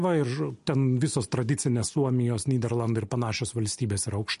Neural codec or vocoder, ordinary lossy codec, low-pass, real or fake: none; MP3, 48 kbps; 14.4 kHz; real